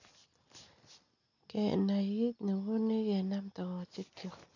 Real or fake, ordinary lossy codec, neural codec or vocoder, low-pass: real; none; none; 7.2 kHz